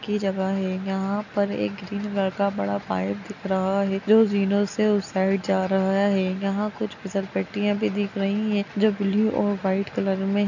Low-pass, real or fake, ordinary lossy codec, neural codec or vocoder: 7.2 kHz; real; none; none